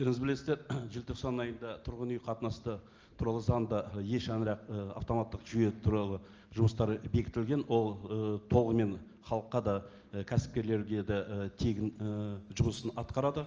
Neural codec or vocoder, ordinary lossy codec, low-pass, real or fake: none; Opus, 24 kbps; 7.2 kHz; real